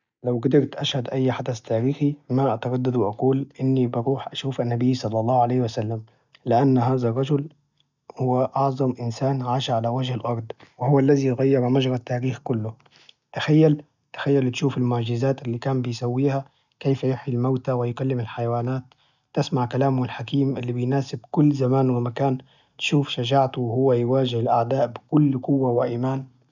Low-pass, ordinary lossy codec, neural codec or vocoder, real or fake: 7.2 kHz; none; none; real